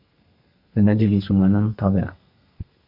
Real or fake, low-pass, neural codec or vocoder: fake; 5.4 kHz; codec, 32 kHz, 1.9 kbps, SNAC